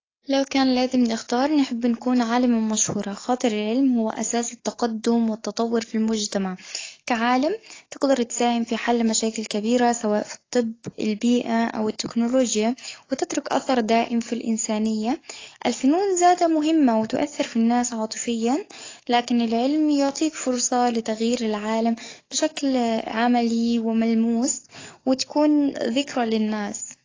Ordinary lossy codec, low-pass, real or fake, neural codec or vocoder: AAC, 32 kbps; 7.2 kHz; fake; codec, 44.1 kHz, 7.8 kbps, DAC